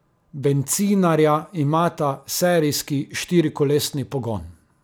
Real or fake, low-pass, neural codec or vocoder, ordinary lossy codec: real; none; none; none